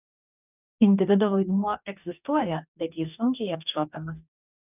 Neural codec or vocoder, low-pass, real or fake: codec, 44.1 kHz, 2.6 kbps, DAC; 3.6 kHz; fake